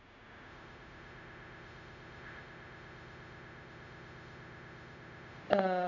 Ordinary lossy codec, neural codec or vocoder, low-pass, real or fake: none; codec, 16 kHz, 0.4 kbps, LongCat-Audio-Codec; 7.2 kHz; fake